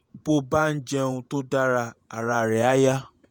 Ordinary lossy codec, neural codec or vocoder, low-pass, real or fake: none; vocoder, 48 kHz, 128 mel bands, Vocos; 19.8 kHz; fake